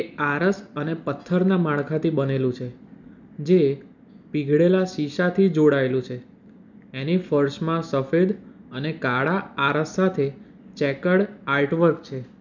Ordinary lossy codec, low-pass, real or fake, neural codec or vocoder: none; 7.2 kHz; real; none